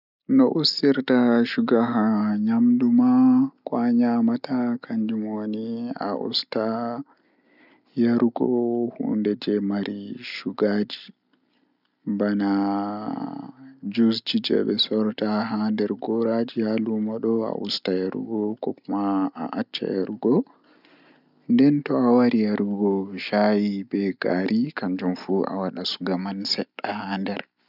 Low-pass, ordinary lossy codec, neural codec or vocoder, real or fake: 5.4 kHz; none; none; real